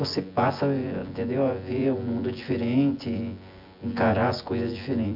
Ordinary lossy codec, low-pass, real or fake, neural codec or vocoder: MP3, 48 kbps; 5.4 kHz; fake; vocoder, 24 kHz, 100 mel bands, Vocos